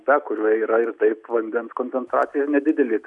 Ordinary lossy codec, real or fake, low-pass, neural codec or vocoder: AAC, 64 kbps; real; 10.8 kHz; none